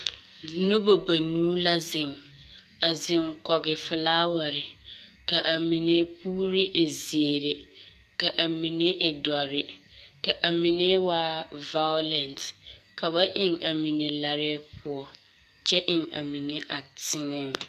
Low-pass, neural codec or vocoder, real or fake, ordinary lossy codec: 14.4 kHz; codec, 44.1 kHz, 2.6 kbps, SNAC; fake; MP3, 96 kbps